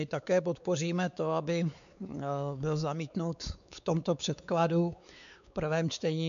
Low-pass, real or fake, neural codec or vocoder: 7.2 kHz; fake; codec, 16 kHz, 4 kbps, X-Codec, WavLM features, trained on Multilingual LibriSpeech